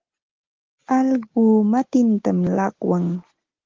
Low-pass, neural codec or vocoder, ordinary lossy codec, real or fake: 7.2 kHz; none; Opus, 16 kbps; real